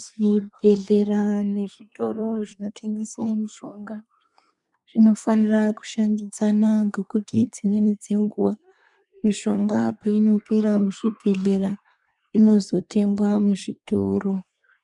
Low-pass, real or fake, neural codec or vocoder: 10.8 kHz; fake; codec, 24 kHz, 1 kbps, SNAC